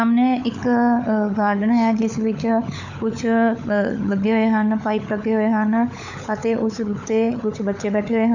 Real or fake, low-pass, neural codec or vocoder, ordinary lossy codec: fake; 7.2 kHz; codec, 16 kHz, 16 kbps, FunCodec, trained on LibriTTS, 50 frames a second; none